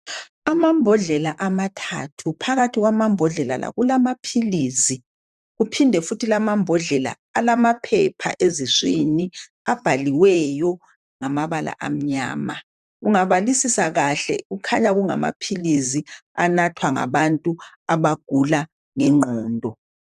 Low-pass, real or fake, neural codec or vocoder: 14.4 kHz; fake; vocoder, 44.1 kHz, 128 mel bands, Pupu-Vocoder